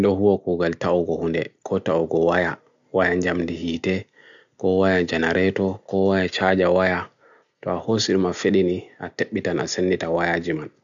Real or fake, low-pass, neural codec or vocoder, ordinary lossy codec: real; 7.2 kHz; none; none